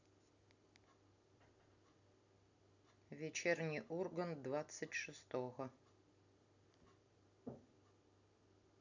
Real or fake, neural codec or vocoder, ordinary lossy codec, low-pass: real; none; none; 7.2 kHz